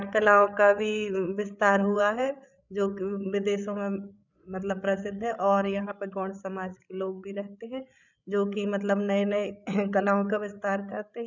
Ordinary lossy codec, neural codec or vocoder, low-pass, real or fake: none; codec, 16 kHz, 8 kbps, FreqCodec, larger model; 7.2 kHz; fake